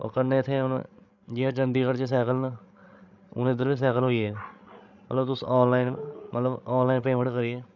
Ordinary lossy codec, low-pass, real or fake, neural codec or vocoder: none; 7.2 kHz; fake; codec, 16 kHz, 16 kbps, FreqCodec, larger model